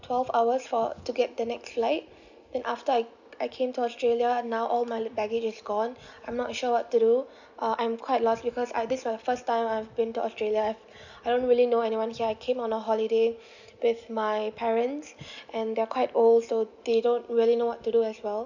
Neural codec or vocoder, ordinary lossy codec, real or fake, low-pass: none; none; real; 7.2 kHz